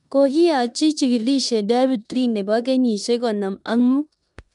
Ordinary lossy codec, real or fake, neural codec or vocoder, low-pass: none; fake; codec, 16 kHz in and 24 kHz out, 0.9 kbps, LongCat-Audio-Codec, four codebook decoder; 10.8 kHz